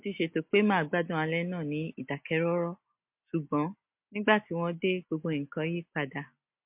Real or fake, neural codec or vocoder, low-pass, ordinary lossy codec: real; none; 3.6 kHz; MP3, 32 kbps